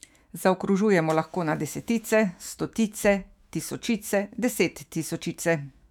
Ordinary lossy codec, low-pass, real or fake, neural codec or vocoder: none; 19.8 kHz; fake; autoencoder, 48 kHz, 128 numbers a frame, DAC-VAE, trained on Japanese speech